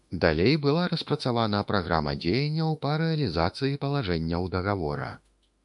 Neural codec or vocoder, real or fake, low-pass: autoencoder, 48 kHz, 32 numbers a frame, DAC-VAE, trained on Japanese speech; fake; 10.8 kHz